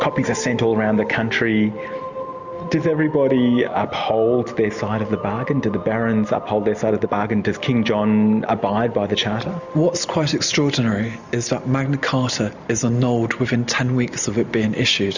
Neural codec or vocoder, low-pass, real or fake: none; 7.2 kHz; real